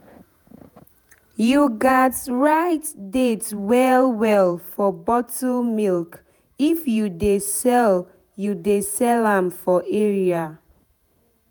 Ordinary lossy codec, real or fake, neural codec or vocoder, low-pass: none; fake; vocoder, 48 kHz, 128 mel bands, Vocos; none